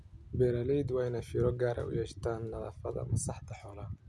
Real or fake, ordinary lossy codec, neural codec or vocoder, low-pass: real; none; none; none